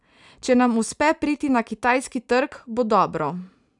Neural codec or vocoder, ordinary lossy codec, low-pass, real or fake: none; none; 10.8 kHz; real